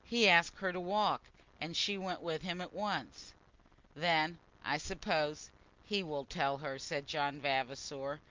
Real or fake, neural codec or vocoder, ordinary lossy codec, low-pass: real; none; Opus, 24 kbps; 7.2 kHz